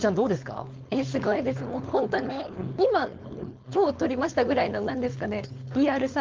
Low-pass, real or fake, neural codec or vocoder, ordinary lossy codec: 7.2 kHz; fake; codec, 16 kHz, 4.8 kbps, FACodec; Opus, 24 kbps